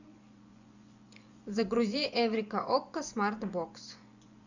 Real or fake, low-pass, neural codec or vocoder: fake; 7.2 kHz; vocoder, 24 kHz, 100 mel bands, Vocos